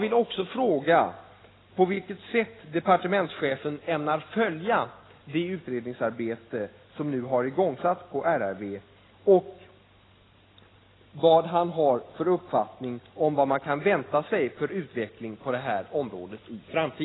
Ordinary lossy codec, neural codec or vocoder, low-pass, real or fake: AAC, 16 kbps; none; 7.2 kHz; real